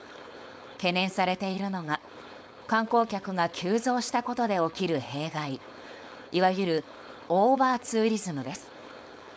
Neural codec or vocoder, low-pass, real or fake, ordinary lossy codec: codec, 16 kHz, 4.8 kbps, FACodec; none; fake; none